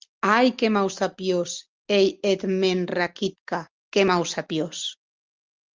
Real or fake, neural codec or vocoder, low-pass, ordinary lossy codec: real; none; 7.2 kHz; Opus, 32 kbps